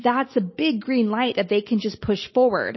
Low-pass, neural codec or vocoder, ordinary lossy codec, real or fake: 7.2 kHz; none; MP3, 24 kbps; real